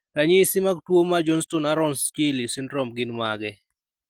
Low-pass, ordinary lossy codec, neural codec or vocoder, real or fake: 19.8 kHz; Opus, 24 kbps; none; real